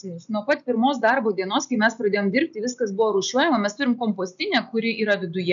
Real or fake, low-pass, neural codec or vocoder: real; 7.2 kHz; none